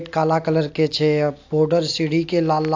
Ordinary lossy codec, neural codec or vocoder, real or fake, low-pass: AAC, 48 kbps; none; real; 7.2 kHz